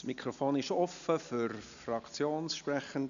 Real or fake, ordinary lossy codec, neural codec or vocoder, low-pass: real; MP3, 96 kbps; none; 7.2 kHz